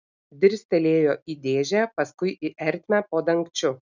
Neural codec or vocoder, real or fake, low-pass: none; real; 7.2 kHz